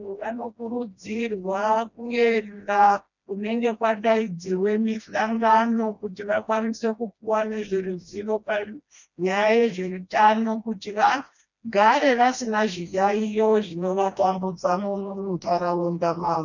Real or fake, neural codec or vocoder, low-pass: fake; codec, 16 kHz, 1 kbps, FreqCodec, smaller model; 7.2 kHz